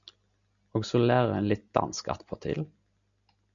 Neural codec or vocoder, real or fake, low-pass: none; real; 7.2 kHz